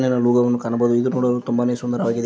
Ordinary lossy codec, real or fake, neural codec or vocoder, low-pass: none; real; none; none